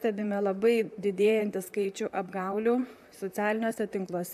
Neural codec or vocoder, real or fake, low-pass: vocoder, 44.1 kHz, 128 mel bands, Pupu-Vocoder; fake; 14.4 kHz